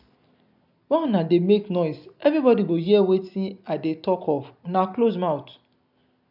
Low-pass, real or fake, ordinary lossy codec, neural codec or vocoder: 5.4 kHz; real; none; none